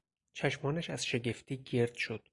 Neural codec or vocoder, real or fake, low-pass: none; real; 10.8 kHz